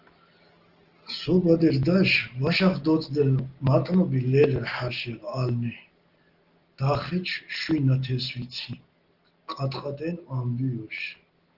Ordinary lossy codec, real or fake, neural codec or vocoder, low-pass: Opus, 16 kbps; real; none; 5.4 kHz